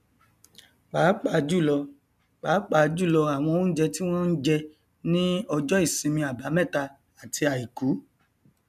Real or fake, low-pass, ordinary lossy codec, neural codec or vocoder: real; 14.4 kHz; none; none